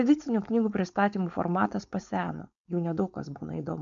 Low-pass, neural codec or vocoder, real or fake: 7.2 kHz; codec, 16 kHz, 4.8 kbps, FACodec; fake